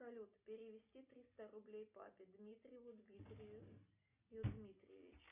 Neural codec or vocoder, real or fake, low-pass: none; real; 3.6 kHz